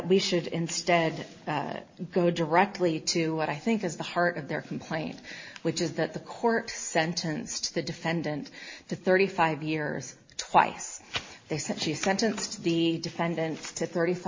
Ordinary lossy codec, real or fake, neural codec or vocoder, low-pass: MP3, 32 kbps; real; none; 7.2 kHz